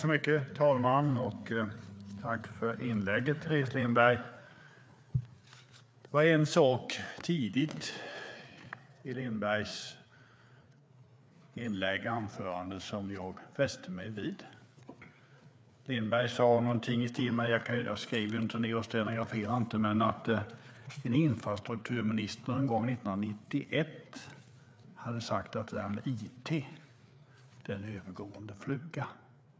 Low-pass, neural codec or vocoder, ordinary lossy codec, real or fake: none; codec, 16 kHz, 4 kbps, FreqCodec, larger model; none; fake